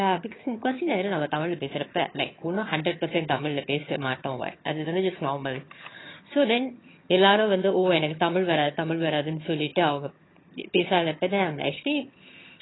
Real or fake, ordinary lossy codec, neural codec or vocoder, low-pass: fake; AAC, 16 kbps; vocoder, 22.05 kHz, 80 mel bands, HiFi-GAN; 7.2 kHz